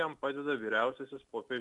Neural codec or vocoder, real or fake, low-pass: none; real; 10.8 kHz